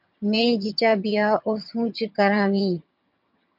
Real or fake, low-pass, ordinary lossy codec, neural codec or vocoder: fake; 5.4 kHz; MP3, 48 kbps; vocoder, 22.05 kHz, 80 mel bands, HiFi-GAN